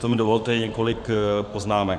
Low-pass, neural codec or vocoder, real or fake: 9.9 kHz; codec, 16 kHz in and 24 kHz out, 2.2 kbps, FireRedTTS-2 codec; fake